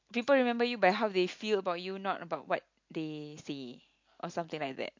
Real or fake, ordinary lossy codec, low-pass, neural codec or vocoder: real; MP3, 48 kbps; 7.2 kHz; none